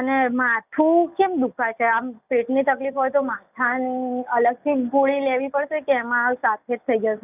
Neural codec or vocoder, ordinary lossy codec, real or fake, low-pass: none; none; real; 3.6 kHz